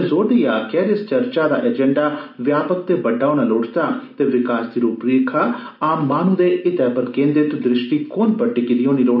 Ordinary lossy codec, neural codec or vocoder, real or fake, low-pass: none; none; real; 5.4 kHz